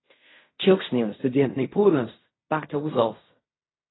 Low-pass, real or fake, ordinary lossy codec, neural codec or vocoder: 7.2 kHz; fake; AAC, 16 kbps; codec, 16 kHz in and 24 kHz out, 0.4 kbps, LongCat-Audio-Codec, fine tuned four codebook decoder